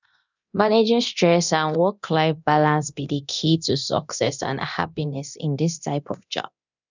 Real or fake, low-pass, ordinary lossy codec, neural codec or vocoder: fake; 7.2 kHz; none; codec, 24 kHz, 0.9 kbps, DualCodec